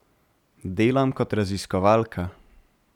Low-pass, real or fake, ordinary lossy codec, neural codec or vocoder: 19.8 kHz; real; none; none